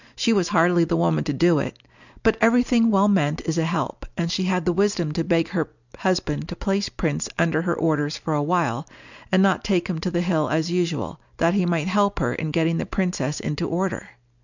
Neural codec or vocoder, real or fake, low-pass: none; real; 7.2 kHz